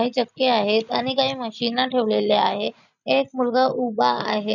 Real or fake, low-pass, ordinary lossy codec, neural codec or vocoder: real; 7.2 kHz; none; none